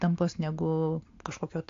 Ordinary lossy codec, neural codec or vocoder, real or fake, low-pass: AAC, 48 kbps; none; real; 7.2 kHz